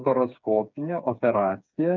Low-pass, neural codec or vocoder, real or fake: 7.2 kHz; codec, 16 kHz, 4 kbps, FreqCodec, smaller model; fake